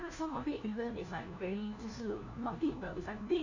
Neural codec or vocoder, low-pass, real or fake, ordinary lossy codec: codec, 16 kHz, 1 kbps, FunCodec, trained on LibriTTS, 50 frames a second; 7.2 kHz; fake; none